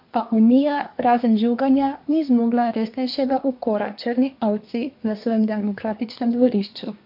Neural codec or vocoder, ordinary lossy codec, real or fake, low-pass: codec, 24 kHz, 1 kbps, SNAC; MP3, 48 kbps; fake; 5.4 kHz